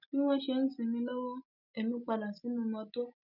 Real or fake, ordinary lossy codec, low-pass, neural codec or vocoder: real; none; 5.4 kHz; none